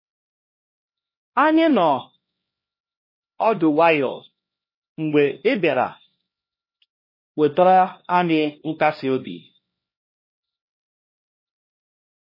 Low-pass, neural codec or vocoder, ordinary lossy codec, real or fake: 5.4 kHz; codec, 16 kHz, 1 kbps, X-Codec, HuBERT features, trained on LibriSpeech; MP3, 24 kbps; fake